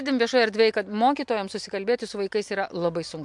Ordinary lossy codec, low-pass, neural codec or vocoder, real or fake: MP3, 64 kbps; 10.8 kHz; none; real